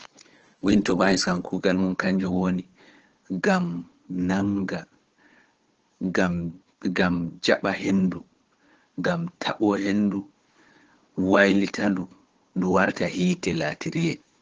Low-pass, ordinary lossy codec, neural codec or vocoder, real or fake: 7.2 kHz; Opus, 16 kbps; codec, 16 kHz, 4 kbps, FunCodec, trained on Chinese and English, 50 frames a second; fake